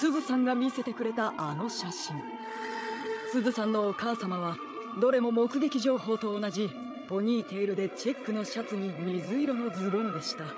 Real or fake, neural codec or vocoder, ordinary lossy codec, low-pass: fake; codec, 16 kHz, 8 kbps, FreqCodec, larger model; none; none